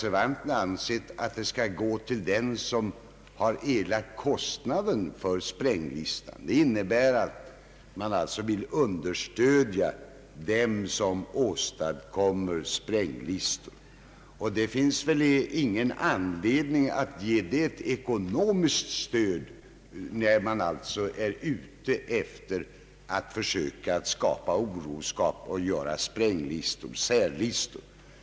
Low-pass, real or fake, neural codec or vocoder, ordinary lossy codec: none; real; none; none